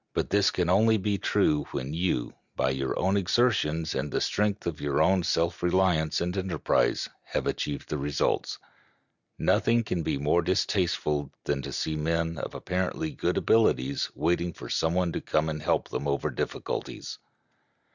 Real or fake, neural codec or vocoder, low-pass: real; none; 7.2 kHz